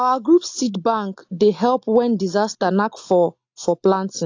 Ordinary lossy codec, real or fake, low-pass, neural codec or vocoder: AAC, 48 kbps; real; 7.2 kHz; none